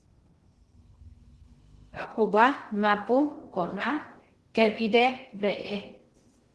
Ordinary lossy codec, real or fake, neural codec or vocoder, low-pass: Opus, 16 kbps; fake; codec, 16 kHz in and 24 kHz out, 0.6 kbps, FocalCodec, streaming, 2048 codes; 10.8 kHz